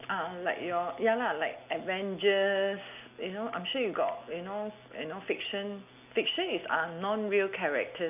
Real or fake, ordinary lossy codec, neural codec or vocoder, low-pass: real; none; none; 3.6 kHz